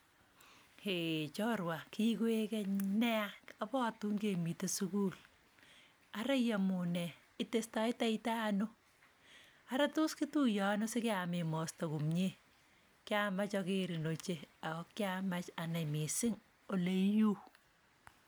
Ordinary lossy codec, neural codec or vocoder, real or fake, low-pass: none; none; real; none